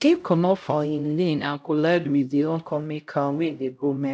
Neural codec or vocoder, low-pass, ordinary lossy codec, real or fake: codec, 16 kHz, 0.5 kbps, X-Codec, HuBERT features, trained on LibriSpeech; none; none; fake